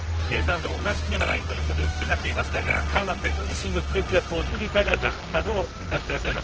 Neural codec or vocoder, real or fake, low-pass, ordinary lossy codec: codec, 24 kHz, 0.9 kbps, WavTokenizer, medium music audio release; fake; 7.2 kHz; Opus, 16 kbps